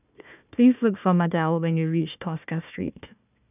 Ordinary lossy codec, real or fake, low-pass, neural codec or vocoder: none; fake; 3.6 kHz; codec, 16 kHz, 1 kbps, FunCodec, trained on Chinese and English, 50 frames a second